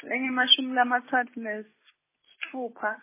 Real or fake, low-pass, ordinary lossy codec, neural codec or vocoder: real; 3.6 kHz; MP3, 16 kbps; none